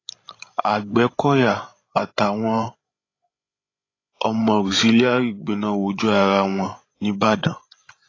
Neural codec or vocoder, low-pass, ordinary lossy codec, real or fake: codec, 16 kHz, 16 kbps, FreqCodec, larger model; 7.2 kHz; AAC, 32 kbps; fake